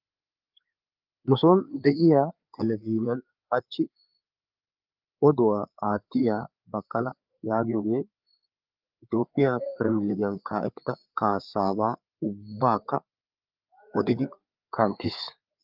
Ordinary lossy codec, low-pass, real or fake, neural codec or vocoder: Opus, 24 kbps; 5.4 kHz; fake; codec, 16 kHz, 4 kbps, FreqCodec, larger model